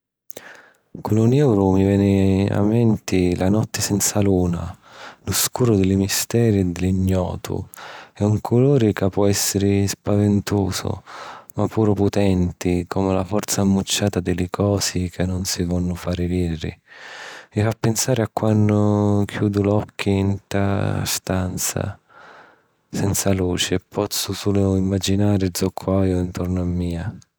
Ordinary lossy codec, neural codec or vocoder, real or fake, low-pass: none; none; real; none